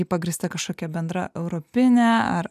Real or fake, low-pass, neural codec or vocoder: real; 14.4 kHz; none